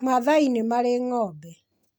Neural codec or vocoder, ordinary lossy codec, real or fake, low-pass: none; none; real; none